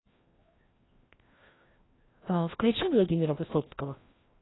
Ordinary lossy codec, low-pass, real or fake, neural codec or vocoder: AAC, 16 kbps; 7.2 kHz; fake; codec, 16 kHz, 1 kbps, FreqCodec, larger model